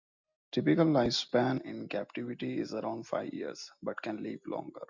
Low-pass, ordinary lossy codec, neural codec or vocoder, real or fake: 7.2 kHz; MP3, 64 kbps; none; real